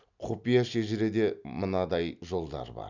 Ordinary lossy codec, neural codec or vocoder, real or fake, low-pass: none; none; real; 7.2 kHz